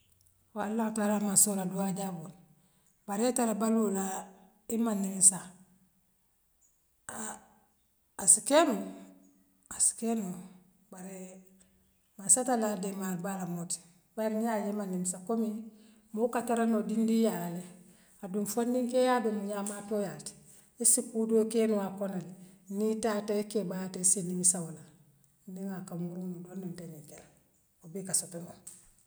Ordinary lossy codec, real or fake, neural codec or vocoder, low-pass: none; real; none; none